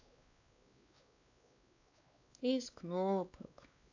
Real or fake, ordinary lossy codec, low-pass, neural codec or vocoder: fake; AAC, 48 kbps; 7.2 kHz; codec, 16 kHz, 2 kbps, X-Codec, WavLM features, trained on Multilingual LibriSpeech